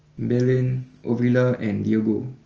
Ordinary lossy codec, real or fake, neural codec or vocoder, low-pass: Opus, 24 kbps; real; none; 7.2 kHz